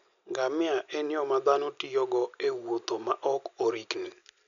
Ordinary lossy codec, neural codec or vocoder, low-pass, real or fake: none; none; 7.2 kHz; real